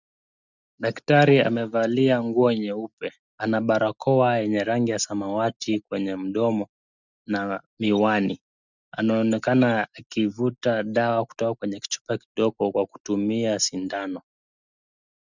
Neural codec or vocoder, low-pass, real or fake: none; 7.2 kHz; real